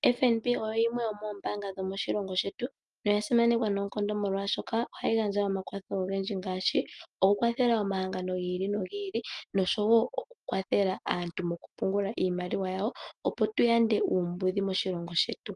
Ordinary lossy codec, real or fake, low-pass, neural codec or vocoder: Opus, 32 kbps; real; 10.8 kHz; none